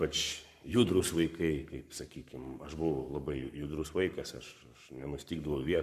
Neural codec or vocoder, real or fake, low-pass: codec, 44.1 kHz, 7.8 kbps, Pupu-Codec; fake; 14.4 kHz